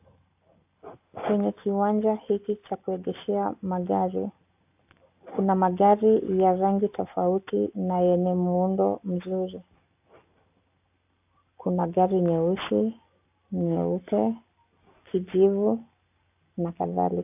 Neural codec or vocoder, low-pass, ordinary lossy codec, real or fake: none; 3.6 kHz; AAC, 32 kbps; real